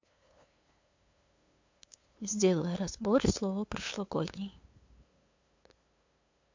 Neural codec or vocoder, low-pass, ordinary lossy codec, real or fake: codec, 16 kHz, 2 kbps, FunCodec, trained on LibriTTS, 25 frames a second; 7.2 kHz; MP3, 48 kbps; fake